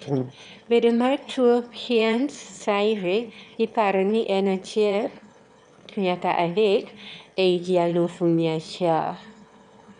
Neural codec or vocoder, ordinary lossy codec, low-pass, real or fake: autoencoder, 22.05 kHz, a latent of 192 numbers a frame, VITS, trained on one speaker; none; 9.9 kHz; fake